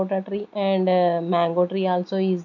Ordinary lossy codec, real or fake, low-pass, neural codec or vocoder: none; real; 7.2 kHz; none